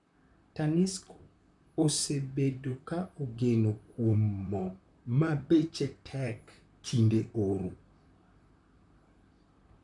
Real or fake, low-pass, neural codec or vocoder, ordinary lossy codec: fake; 10.8 kHz; vocoder, 44.1 kHz, 128 mel bands, Pupu-Vocoder; none